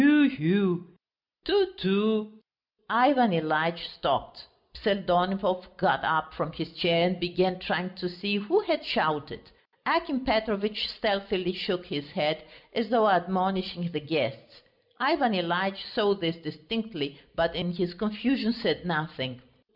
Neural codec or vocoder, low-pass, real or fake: none; 5.4 kHz; real